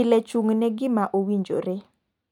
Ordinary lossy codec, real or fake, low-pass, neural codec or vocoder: none; real; 19.8 kHz; none